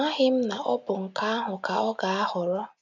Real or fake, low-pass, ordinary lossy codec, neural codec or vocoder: real; 7.2 kHz; none; none